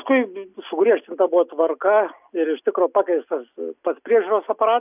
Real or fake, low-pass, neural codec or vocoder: real; 3.6 kHz; none